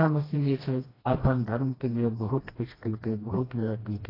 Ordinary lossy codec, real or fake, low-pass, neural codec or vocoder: AAC, 24 kbps; fake; 5.4 kHz; codec, 16 kHz, 1 kbps, FreqCodec, smaller model